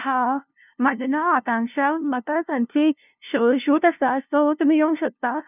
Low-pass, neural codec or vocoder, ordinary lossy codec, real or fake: 3.6 kHz; codec, 16 kHz, 0.5 kbps, FunCodec, trained on LibriTTS, 25 frames a second; none; fake